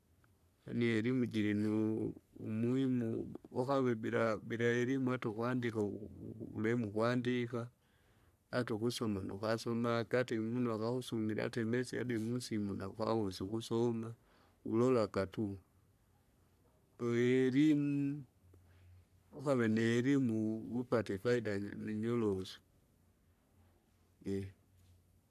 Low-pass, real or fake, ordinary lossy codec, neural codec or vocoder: 14.4 kHz; fake; none; codec, 44.1 kHz, 3.4 kbps, Pupu-Codec